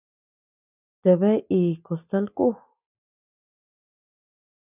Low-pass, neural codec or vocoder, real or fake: 3.6 kHz; none; real